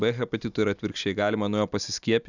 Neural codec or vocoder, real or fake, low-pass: none; real; 7.2 kHz